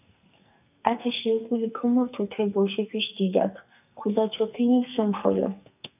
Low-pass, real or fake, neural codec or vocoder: 3.6 kHz; fake; codec, 44.1 kHz, 2.6 kbps, SNAC